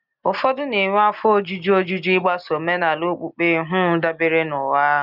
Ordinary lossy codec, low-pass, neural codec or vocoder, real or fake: none; 5.4 kHz; none; real